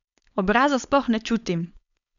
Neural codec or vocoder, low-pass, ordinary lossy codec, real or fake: codec, 16 kHz, 4.8 kbps, FACodec; 7.2 kHz; none; fake